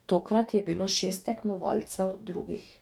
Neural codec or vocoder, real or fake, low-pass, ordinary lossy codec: codec, 44.1 kHz, 2.6 kbps, DAC; fake; 19.8 kHz; none